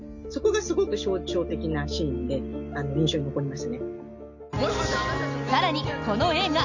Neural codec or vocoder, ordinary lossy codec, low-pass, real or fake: none; none; 7.2 kHz; real